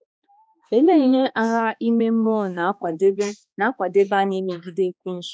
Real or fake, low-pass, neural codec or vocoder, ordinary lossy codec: fake; none; codec, 16 kHz, 2 kbps, X-Codec, HuBERT features, trained on balanced general audio; none